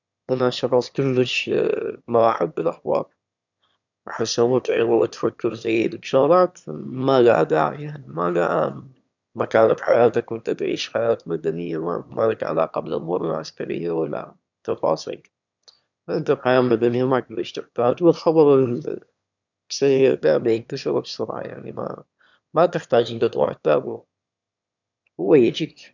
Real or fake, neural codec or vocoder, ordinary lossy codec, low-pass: fake; autoencoder, 22.05 kHz, a latent of 192 numbers a frame, VITS, trained on one speaker; none; 7.2 kHz